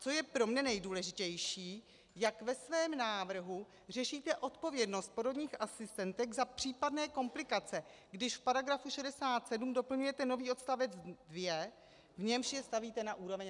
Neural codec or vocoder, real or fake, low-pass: none; real; 10.8 kHz